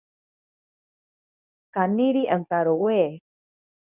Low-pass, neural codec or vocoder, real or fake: 3.6 kHz; codec, 24 kHz, 0.9 kbps, WavTokenizer, medium speech release version 2; fake